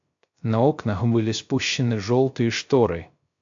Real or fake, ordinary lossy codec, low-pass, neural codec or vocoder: fake; MP3, 48 kbps; 7.2 kHz; codec, 16 kHz, 0.3 kbps, FocalCodec